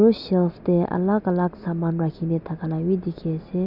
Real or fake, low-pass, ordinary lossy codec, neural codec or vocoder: real; 5.4 kHz; none; none